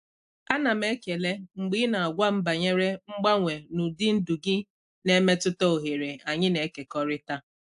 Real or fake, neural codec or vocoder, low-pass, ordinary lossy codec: real; none; 10.8 kHz; AAC, 96 kbps